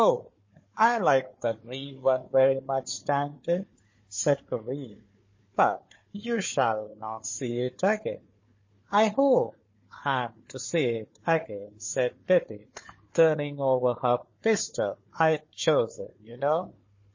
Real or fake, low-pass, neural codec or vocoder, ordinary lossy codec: fake; 7.2 kHz; codec, 16 kHz, 4 kbps, FunCodec, trained on Chinese and English, 50 frames a second; MP3, 32 kbps